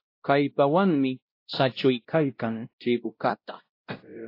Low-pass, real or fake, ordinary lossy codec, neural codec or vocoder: 5.4 kHz; fake; AAC, 32 kbps; codec, 16 kHz, 0.5 kbps, X-Codec, WavLM features, trained on Multilingual LibriSpeech